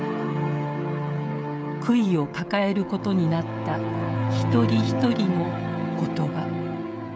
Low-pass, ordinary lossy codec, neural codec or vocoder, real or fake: none; none; codec, 16 kHz, 16 kbps, FreqCodec, smaller model; fake